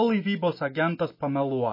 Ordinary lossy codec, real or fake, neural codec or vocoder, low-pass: MP3, 24 kbps; real; none; 5.4 kHz